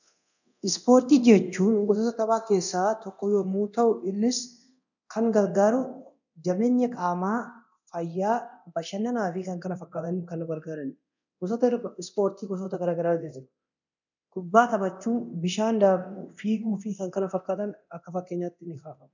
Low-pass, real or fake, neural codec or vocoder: 7.2 kHz; fake; codec, 24 kHz, 0.9 kbps, DualCodec